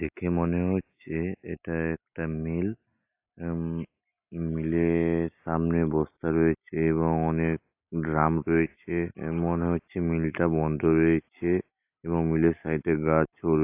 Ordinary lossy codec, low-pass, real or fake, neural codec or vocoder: AAC, 32 kbps; 3.6 kHz; real; none